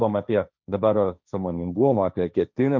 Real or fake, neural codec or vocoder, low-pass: fake; codec, 16 kHz, 1.1 kbps, Voila-Tokenizer; 7.2 kHz